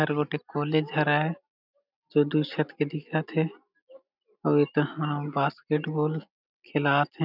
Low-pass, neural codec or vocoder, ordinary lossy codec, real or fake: 5.4 kHz; none; none; real